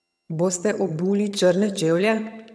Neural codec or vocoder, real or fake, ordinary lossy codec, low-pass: vocoder, 22.05 kHz, 80 mel bands, HiFi-GAN; fake; none; none